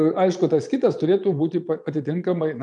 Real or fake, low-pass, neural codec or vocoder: fake; 9.9 kHz; vocoder, 22.05 kHz, 80 mel bands, Vocos